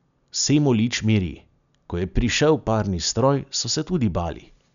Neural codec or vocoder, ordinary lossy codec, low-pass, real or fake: none; none; 7.2 kHz; real